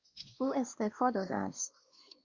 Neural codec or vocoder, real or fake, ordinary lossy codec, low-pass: codec, 16 kHz, 2 kbps, X-Codec, WavLM features, trained on Multilingual LibriSpeech; fake; Opus, 64 kbps; 7.2 kHz